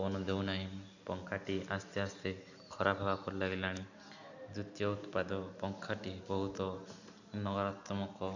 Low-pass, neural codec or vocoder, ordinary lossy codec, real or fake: 7.2 kHz; none; none; real